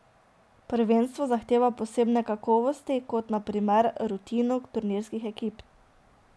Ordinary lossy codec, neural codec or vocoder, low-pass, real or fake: none; none; none; real